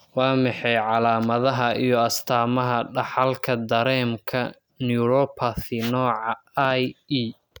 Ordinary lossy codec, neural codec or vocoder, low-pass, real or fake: none; none; none; real